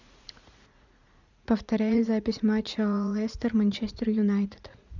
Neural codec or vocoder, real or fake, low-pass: vocoder, 44.1 kHz, 80 mel bands, Vocos; fake; 7.2 kHz